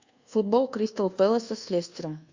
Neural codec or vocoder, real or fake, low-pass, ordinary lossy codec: codec, 24 kHz, 1.2 kbps, DualCodec; fake; 7.2 kHz; Opus, 64 kbps